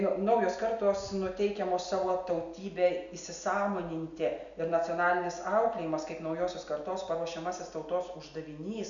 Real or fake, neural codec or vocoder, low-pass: real; none; 7.2 kHz